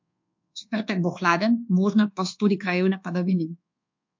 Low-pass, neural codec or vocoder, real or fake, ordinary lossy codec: 7.2 kHz; codec, 24 kHz, 1.2 kbps, DualCodec; fake; MP3, 48 kbps